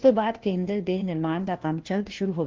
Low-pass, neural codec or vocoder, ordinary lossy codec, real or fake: 7.2 kHz; codec, 16 kHz, 1 kbps, FunCodec, trained on LibriTTS, 50 frames a second; Opus, 16 kbps; fake